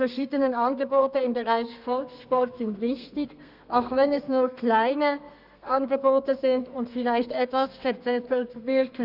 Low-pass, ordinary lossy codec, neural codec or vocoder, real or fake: 5.4 kHz; none; codec, 32 kHz, 1.9 kbps, SNAC; fake